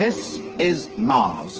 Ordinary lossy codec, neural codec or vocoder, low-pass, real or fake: Opus, 16 kbps; codec, 16 kHz, 4 kbps, FreqCodec, smaller model; 7.2 kHz; fake